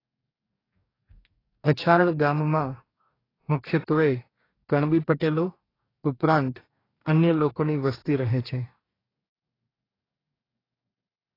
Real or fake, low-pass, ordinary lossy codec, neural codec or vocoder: fake; 5.4 kHz; AAC, 24 kbps; codec, 44.1 kHz, 2.6 kbps, DAC